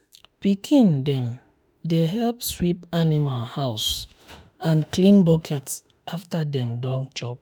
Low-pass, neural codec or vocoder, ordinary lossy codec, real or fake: none; autoencoder, 48 kHz, 32 numbers a frame, DAC-VAE, trained on Japanese speech; none; fake